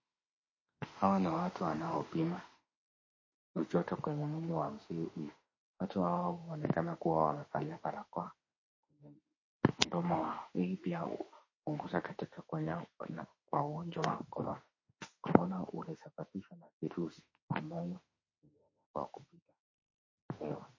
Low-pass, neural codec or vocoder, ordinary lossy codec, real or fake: 7.2 kHz; autoencoder, 48 kHz, 32 numbers a frame, DAC-VAE, trained on Japanese speech; MP3, 32 kbps; fake